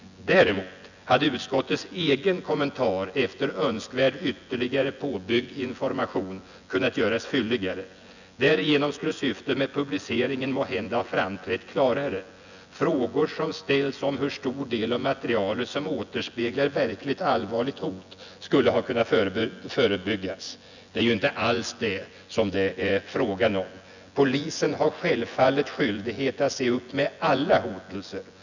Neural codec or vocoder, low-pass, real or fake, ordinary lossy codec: vocoder, 24 kHz, 100 mel bands, Vocos; 7.2 kHz; fake; none